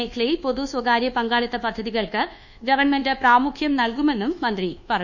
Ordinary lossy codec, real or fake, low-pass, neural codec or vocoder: none; fake; 7.2 kHz; codec, 24 kHz, 1.2 kbps, DualCodec